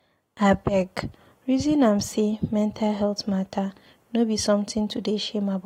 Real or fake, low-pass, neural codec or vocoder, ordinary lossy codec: real; 19.8 kHz; none; AAC, 48 kbps